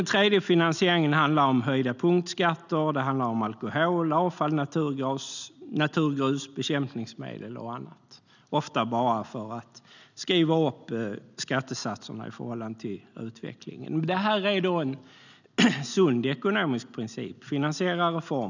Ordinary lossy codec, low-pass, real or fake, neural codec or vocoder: none; 7.2 kHz; real; none